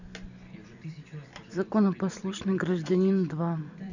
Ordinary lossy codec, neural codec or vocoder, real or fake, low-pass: none; vocoder, 44.1 kHz, 128 mel bands every 256 samples, BigVGAN v2; fake; 7.2 kHz